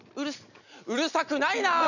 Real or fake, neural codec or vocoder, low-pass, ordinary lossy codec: real; none; 7.2 kHz; none